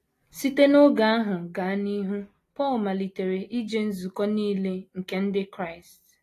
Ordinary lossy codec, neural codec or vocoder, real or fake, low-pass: AAC, 48 kbps; none; real; 14.4 kHz